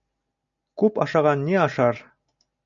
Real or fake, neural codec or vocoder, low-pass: real; none; 7.2 kHz